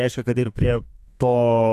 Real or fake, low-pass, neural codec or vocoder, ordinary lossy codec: fake; 14.4 kHz; codec, 32 kHz, 1.9 kbps, SNAC; AAC, 96 kbps